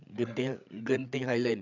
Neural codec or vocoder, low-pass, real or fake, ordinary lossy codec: codec, 16 kHz, 8 kbps, FreqCodec, larger model; 7.2 kHz; fake; none